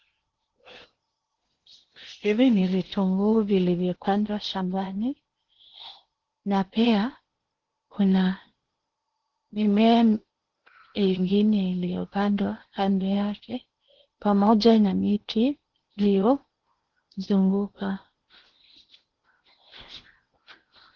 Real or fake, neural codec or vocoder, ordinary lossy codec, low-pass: fake; codec, 16 kHz in and 24 kHz out, 0.8 kbps, FocalCodec, streaming, 65536 codes; Opus, 16 kbps; 7.2 kHz